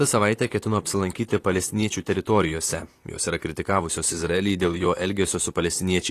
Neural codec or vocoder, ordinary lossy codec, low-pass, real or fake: vocoder, 44.1 kHz, 128 mel bands, Pupu-Vocoder; AAC, 48 kbps; 14.4 kHz; fake